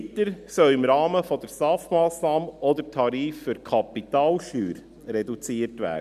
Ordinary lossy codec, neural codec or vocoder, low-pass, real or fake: none; none; 14.4 kHz; real